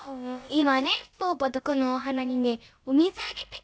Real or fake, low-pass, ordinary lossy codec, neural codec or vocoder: fake; none; none; codec, 16 kHz, about 1 kbps, DyCAST, with the encoder's durations